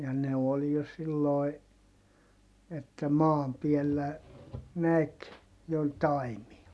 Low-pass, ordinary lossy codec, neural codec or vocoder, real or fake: none; none; none; real